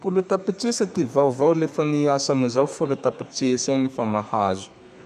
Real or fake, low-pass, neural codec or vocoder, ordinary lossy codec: fake; 14.4 kHz; codec, 32 kHz, 1.9 kbps, SNAC; none